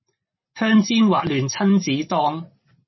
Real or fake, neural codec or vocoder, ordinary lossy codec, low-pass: real; none; MP3, 24 kbps; 7.2 kHz